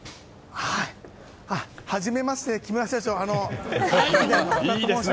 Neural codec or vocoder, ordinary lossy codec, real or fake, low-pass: none; none; real; none